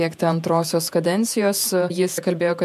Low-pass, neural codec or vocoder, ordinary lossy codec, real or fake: 14.4 kHz; vocoder, 48 kHz, 128 mel bands, Vocos; MP3, 96 kbps; fake